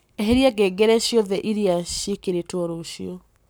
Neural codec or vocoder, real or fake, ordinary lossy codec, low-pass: codec, 44.1 kHz, 7.8 kbps, Pupu-Codec; fake; none; none